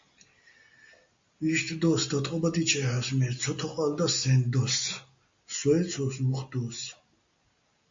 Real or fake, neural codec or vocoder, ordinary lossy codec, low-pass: real; none; MP3, 48 kbps; 7.2 kHz